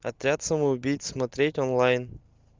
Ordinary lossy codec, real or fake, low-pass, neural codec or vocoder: Opus, 24 kbps; real; 7.2 kHz; none